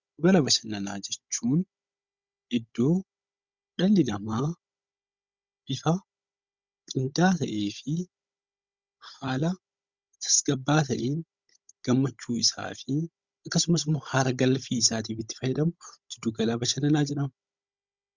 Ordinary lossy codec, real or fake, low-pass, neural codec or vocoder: Opus, 64 kbps; fake; 7.2 kHz; codec, 16 kHz, 16 kbps, FunCodec, trained on Chinese and English, 50 frames a second